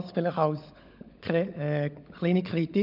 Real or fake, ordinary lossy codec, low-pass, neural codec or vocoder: fake; none; 5.4 kHz; codec, 16 kHz, 16 kbps, FunCodec, trained on LibriTTS, 50 frames a second